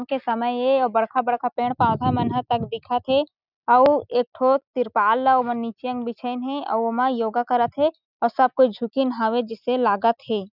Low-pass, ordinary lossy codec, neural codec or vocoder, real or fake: 5.4 kHz; none; none; real